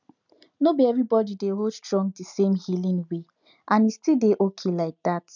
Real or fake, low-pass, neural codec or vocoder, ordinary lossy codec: real; 7.2 kHz; none; none